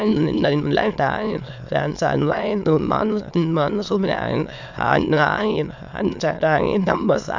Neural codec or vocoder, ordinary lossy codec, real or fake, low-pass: autoencoder, 22.05 kHz, a latent of 192 numbers a frame, VITS, trained on many speakers; MP3, 64 kbps; fake; 7.2 kHz